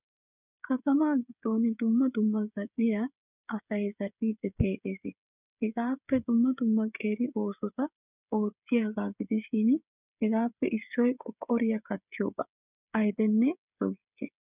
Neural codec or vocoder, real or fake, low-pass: codec, 16 kHz, 8 kbps, FreqCodec, smaller model; fake; 3.6 kHz